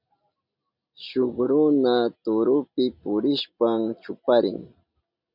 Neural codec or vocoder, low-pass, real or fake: none; 5.4 kHz; real